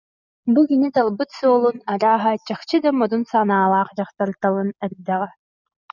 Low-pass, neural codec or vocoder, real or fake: 7.2 kHz; none; real